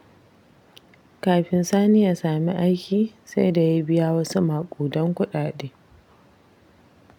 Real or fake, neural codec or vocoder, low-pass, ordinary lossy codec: real; none; 19.8 kHz; none